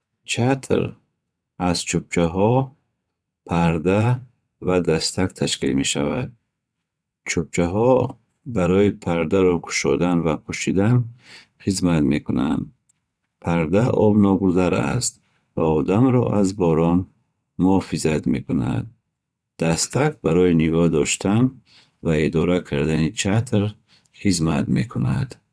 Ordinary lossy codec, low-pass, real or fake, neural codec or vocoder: none; none; fake; vocoder, 22.05 kHz, 80 mel bands, WaveNeXt